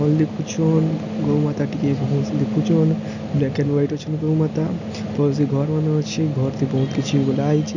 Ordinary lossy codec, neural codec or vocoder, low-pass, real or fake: none; none; 7.2 kHz; real